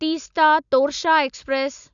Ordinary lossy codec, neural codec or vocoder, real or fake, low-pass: none; none; real; 7.2 kHz